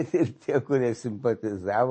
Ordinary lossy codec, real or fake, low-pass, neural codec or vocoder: MP3, 32 kbps; real; 9.9 kHz; none